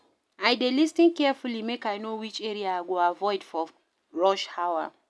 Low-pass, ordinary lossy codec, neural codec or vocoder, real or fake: none; none; none; real